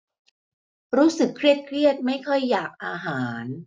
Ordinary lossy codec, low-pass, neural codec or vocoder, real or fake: none; none; none; real